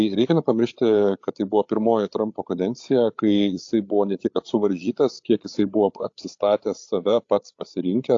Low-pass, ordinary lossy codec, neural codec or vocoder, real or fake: 7.2 kHz; AAC, 48 kbps; codec, 16 kHz, 8 kbps, FreqCodec, larger model; fake